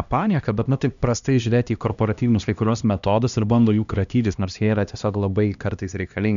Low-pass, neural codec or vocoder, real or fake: 7.2 kHz; codec, 16 kHz, 1 kbps, X-Codec, HuBERT features, trained on LibriSpeech; fake